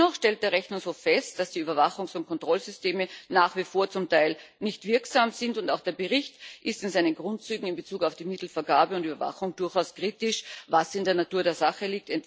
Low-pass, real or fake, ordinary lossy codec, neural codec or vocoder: none; real; none; none